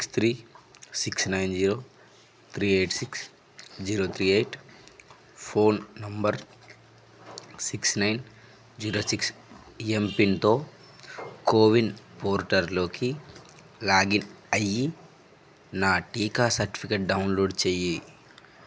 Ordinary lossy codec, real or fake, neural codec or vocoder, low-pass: none; real; none; none